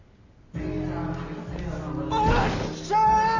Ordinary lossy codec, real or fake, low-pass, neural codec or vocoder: none; real; 7.2 kHz; none